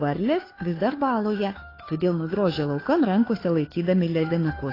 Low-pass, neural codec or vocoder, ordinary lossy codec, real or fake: 5.4 kHz; codec, 16 kHz, 2 kbps, FunCodec, trained on Chinese and English, 25 frames a second; AAC, 24 kbps; fake